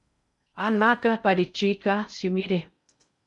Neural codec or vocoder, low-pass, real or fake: codec, 16 kHz in and 24 kHz out, 0.6 kbps, FocalCodec, streaming, 4096 codes; 10.8 kHz; fake